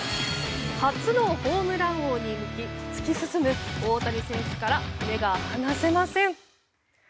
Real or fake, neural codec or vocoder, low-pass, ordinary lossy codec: real; none; none; none